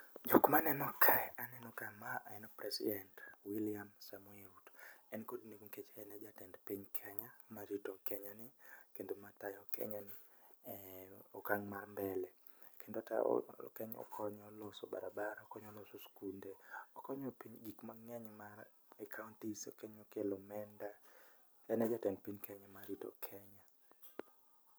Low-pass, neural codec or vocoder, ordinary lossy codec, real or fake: none; none; none; real